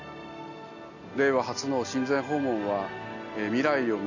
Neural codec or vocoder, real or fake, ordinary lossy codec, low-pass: none; real; none; 7.2 kHz